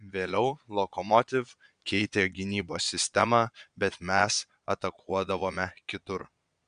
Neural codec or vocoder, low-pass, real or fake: vocoder, 22.05 kHz, 80 mel bands, Vocos; 9.9 kHz; fake